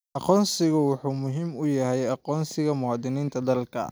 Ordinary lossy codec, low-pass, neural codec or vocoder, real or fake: none; none; none; real